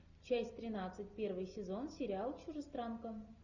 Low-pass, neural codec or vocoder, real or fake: 7.2 kHz; none; real